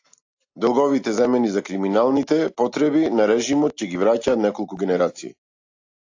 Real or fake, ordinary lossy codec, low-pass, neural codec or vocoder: real; AAC, 48 kbps; 7.2 kHz; none